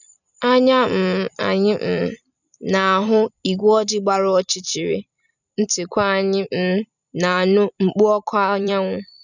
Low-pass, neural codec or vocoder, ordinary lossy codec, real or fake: 7.2 kHz; vocoder, 44.1 kHz, 128 mel bands every 256 samples, BigVGAN v2; none; fake